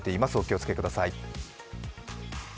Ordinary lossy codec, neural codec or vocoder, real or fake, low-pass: none; none; real; none